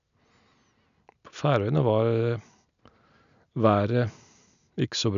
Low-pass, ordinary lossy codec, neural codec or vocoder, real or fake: 7.2 kHz; none; none; real